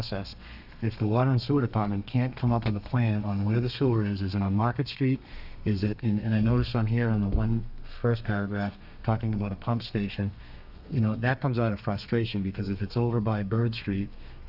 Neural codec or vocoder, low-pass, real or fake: codec, 32 kHz, 1.9 kbps, SNAC; 5.4 kHz; fake